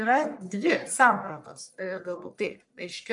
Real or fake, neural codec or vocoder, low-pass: fake; codec, 44.1 kHz, 1.7 kbps, Pupu-Codec; 10.8 kHz